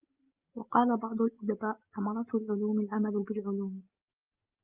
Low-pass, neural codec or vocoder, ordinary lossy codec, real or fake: 3.6 kHz; none; Opus, 24 kbps; real